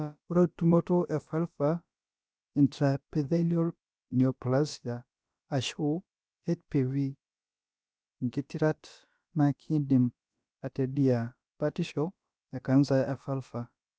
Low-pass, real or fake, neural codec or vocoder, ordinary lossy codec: none; fake; codec, 16 kHz, about 1 kbps, DyCAST, with the encoder's durations; none